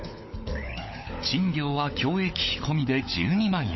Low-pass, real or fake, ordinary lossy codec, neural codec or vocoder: 7.2 kHz; fake; MP3, 24 kbps; codec, 16 kHz, 16 kbps, FunCodec, trained on LibriTTS, 50 frames a second